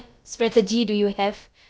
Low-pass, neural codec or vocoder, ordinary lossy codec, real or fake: none; codec, 16 kHz, about 1 kbps, DyCAST, with the encoder's durations; none; fake